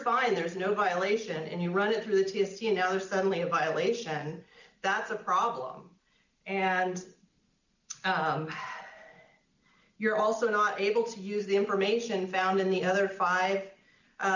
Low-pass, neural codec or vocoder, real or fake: 7.2 kHz; none; real